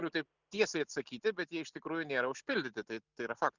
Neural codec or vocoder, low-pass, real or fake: none; 7.2 kHz; real